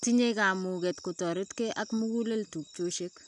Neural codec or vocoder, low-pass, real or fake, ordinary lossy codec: none; 10.8 kHz; real; none